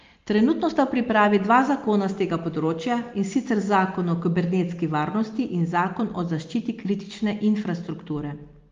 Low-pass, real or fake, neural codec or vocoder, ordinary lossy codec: 7.2 kHz; real; none; Opus, 32 kbps